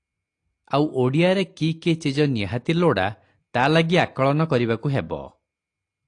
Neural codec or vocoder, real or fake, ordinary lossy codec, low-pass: none; real; AAC, 48 kbps; 9.9 kHz